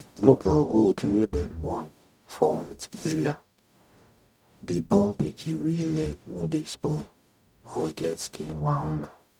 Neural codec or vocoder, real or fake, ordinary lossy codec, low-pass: codec, 44.1 kHz, 0.9 kbps, DAC; fake; none; 19.8 kHz